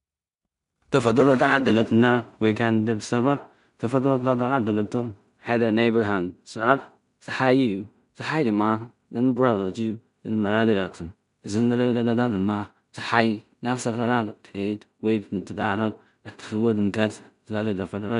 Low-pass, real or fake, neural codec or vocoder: 10.8 kHz; fake; codec, 16 kHz in and 24 kHz out, 0.4 kbps, LongCat-Audio-Codec, two codebook decoder